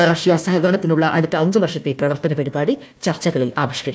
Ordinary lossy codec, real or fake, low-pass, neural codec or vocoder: none; fake; none; codec, 16 kHz, 1 kbps, FunCodec, trained on Chinese and English, 50 frames a second